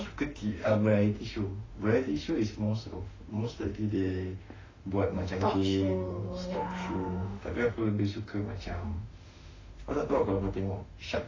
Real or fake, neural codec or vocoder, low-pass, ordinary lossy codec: fake; autoencoder, 48 kHz, 32 numbers a frame, DAC-VAE, trained on Japanese speech; 7.2 kHz; AAC, 32 kbps